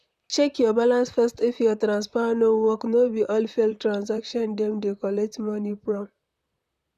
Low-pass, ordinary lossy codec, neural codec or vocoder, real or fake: 14.4 kHz; none; vocoder, 44.1 kHz, 128 mel bands, Pupu-Vocoder; fake